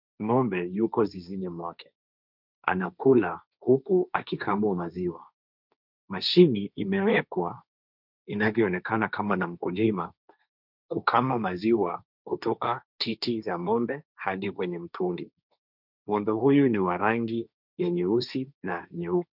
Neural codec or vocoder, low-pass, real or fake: codec, 16 kHz, 1.1 kbps, Voila-Tokenizer; 5.4 kHz; fake